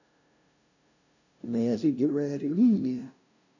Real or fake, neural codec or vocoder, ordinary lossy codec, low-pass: fake; codec, 16 kHz, 0.5 kbps, FunCodec, trained on LibriTTS, 25 frames a second; none; 7.2 kHz